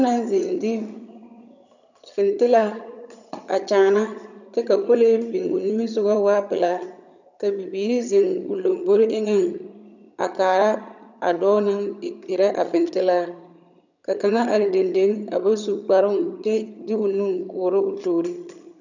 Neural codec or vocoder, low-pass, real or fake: vocoder, 22.05 kHz, 80 mel bands, HiFi-GAN; 7.2 kHz; fake